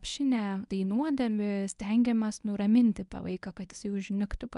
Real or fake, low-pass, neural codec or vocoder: fake; 10.8 kHz; codec, 24 kHz, 0.9 kbps, WavTokenizer, medium speech release version 1